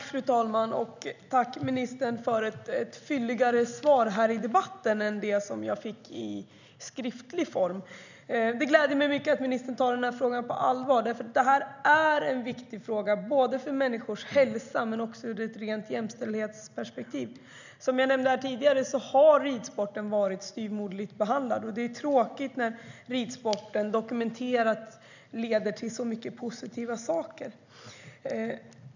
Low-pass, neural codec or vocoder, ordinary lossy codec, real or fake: 7.2 kHz; none; none; real